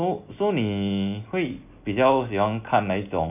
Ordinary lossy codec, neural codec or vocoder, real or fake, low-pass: none; none; real; 3.6 kHz